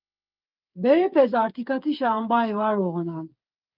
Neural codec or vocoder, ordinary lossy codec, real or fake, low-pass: codec, 16 kHz, 8 kbps, FreqCodec, smaller model; Opus, 24 kbps; fake; 5.4 kHz